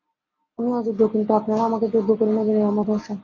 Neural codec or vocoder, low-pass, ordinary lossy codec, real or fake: none; 7.2 kHz; AAC, 32 kbps; real